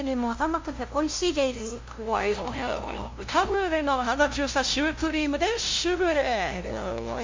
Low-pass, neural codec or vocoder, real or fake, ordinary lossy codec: 7.2 kHz; codec, 16 kHz, 0.5 kbps, FunCodec, trained on LibriTTS, 25 frames a second; fake; MP3, 48 kbps